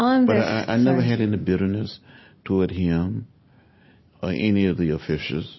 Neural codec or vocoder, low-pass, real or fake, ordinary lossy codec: none; 7.2 kHz; real; MP3, 24 kbps